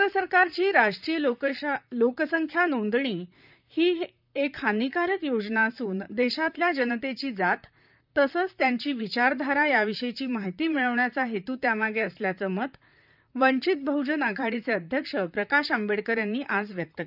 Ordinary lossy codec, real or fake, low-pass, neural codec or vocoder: none; fake; 5.4 kHz; vocoder, 44.1 kHz, 128 mel bands, Pupu-Vocoder